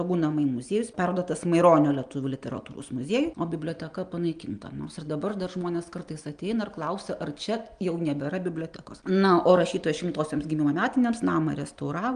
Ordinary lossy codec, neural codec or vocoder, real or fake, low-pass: Opus, 32 kbps; none; real; 9.9 kHz